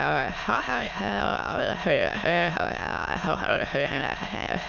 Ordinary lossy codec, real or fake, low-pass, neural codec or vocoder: none; fake; 7.2 kHz; autoencoder, 22.05 kHz, a latent of 192 numbers a frame, VITS, trained on many speakers